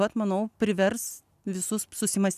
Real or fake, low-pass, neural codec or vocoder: real; 14.4 kHz; none